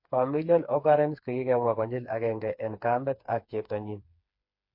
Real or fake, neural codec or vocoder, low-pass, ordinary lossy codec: fake; codec, 16 kHz, 4 kbps, FreqCodec, smaller model; 5.4 kHz; MP3, 32 kbps